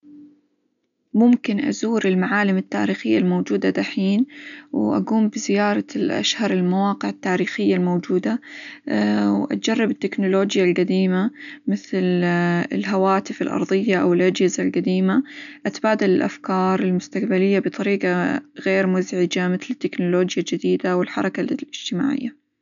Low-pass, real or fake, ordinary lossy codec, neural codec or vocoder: 7.2 kHz; real; none; none